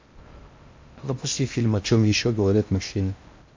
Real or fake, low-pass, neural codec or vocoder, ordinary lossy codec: fake; 7.2 kHz; codec, 16 kHz in and 24 kHz out, 0.6 kbps, FocalCodec, streaming, 4096 codes; MP3, 48 kbps